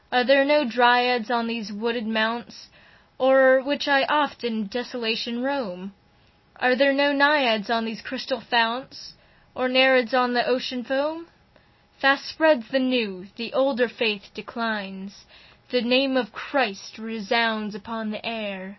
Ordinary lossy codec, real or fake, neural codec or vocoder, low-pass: MP3, 24 kbps; real; none; 7.2 kHz